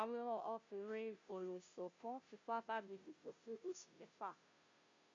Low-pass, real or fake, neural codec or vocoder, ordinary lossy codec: 7.2 kHz; fake; codec, 16 kHz, 0.5 kbps, FunCodec, trained on Chinese and English, 25 frames a second; MP3, 48 kbps